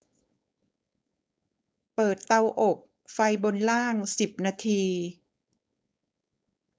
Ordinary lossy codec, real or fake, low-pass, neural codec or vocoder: none; fake; none; codec, 16 kHz, 4.8 kbps, FACodec